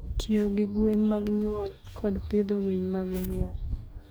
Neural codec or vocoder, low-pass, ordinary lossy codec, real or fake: codec, 44.1 kHz, 2.6 kbps, DAC; none; none; fake